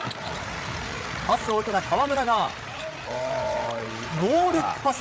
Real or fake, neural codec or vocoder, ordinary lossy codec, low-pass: fake; codec, 16 kHz, 8 kbps, FreqCodec, larger model; none; none